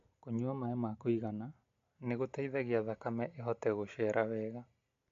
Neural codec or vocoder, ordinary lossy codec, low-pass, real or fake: none; MP3, 48 kbps; 7.2 kHz; real